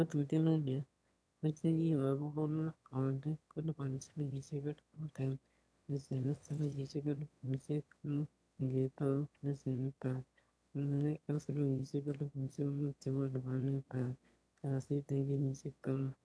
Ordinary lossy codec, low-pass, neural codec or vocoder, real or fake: none; none; autoencoder, 22.05 kHz, a latent of 192 numbers a frame, VITS, trained on one speaker; fake